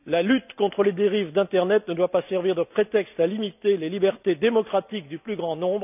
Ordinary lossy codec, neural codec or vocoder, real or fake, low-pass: none; none; real; 3.6 kHz